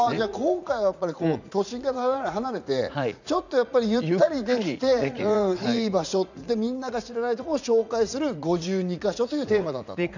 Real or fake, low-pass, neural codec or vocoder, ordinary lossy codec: fake; 7.2 kHz; vocoder, 44.1 kHz, 80 mel bands, Vocos; none